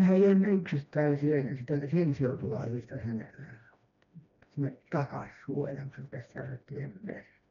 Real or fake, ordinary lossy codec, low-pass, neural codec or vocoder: fake; MP3, 96 kbps; 7.2 kHz; codec, 16 kHz, 1 kbps, FreqCodec, smaller model